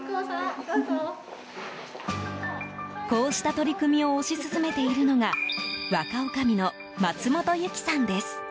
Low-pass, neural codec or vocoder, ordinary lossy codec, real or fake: none; none; none; real